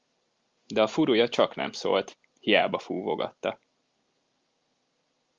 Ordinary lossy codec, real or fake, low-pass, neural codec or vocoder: Opus, 32 kbps; real; 7.2 kHz; none